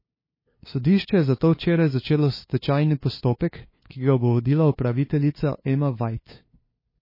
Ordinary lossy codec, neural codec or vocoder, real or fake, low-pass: MP3, 24 kbps; codec, 16 kHz, 2 kbps, FunCodec, trained on LibriTTS, 25 frames a second; fake; 5.4 kHz